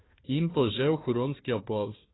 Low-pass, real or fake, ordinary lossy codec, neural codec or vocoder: 7.2 kHz; fake; AAC, 16 kbps; codec, 16 kHz, 1 kbps, FunCodec, trained on Chinese and English, 50 frames a second